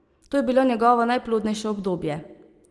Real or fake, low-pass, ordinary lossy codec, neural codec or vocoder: real; 10.8 kHz; Opus, 24 kbps; none